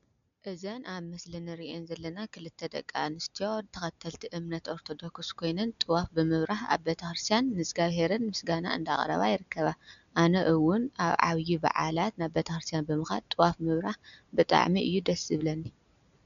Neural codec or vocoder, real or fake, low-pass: none; real; 7.2 kHz